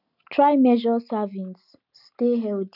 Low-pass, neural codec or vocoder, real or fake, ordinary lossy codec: 5.4 kHz; none; real; none